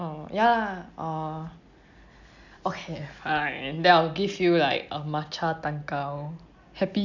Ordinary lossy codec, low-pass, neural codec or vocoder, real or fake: none; 7.2 kHz; none; real